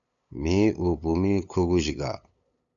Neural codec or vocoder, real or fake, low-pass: codec, 16 kHz, 8 kbps, FunCodec, trained on LibriTTS, 25 frames a second; fake; 7.2 kHz